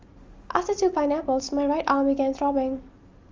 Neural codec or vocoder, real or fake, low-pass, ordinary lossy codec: none; real; 7.2 kHz; Opus, 32 kbps